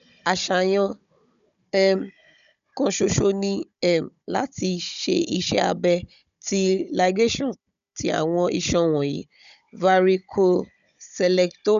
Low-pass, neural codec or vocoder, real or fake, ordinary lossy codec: 7.2 kHz; codec, 16 kHz, 16 kbps, FunCodec, trained on Chinese and English, 50 frames a second; fake; none